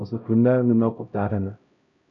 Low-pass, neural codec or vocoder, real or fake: 7.2 kHz; codec, 16 kHz, 0.5 kbps, X-Codec, HuBERT features, trained on LibriSpeech; fake